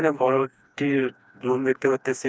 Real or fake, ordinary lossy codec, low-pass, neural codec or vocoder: fake; none; none; codec, 16 kHz, 2 kbps, FreqCodec, smaller model